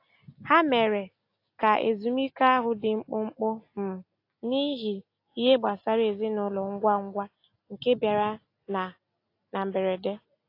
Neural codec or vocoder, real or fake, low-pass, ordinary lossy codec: none; real; 5.4 kHz; AAC, 32 kbps